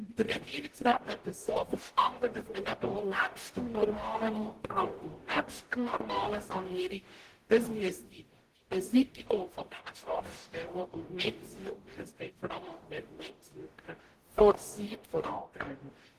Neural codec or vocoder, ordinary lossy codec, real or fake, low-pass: codec, 44.1 kHz, 0.9 kbps, DAC; Opus, 16 kbps; fake; 14.4 kHz